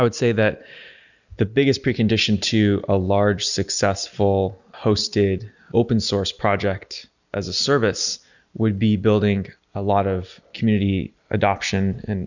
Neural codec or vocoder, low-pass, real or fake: none; 7.2 kHz; real